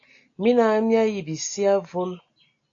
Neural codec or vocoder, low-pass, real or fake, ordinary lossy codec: none; 7.2 kHz; real; AAC, 48 kbps